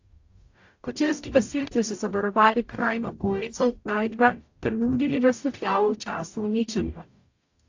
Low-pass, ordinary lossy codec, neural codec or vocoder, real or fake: 7.2 kHz; AAC, 48 kbps; codec, 44.1 kHz, 0.9 kbps, DAC; fake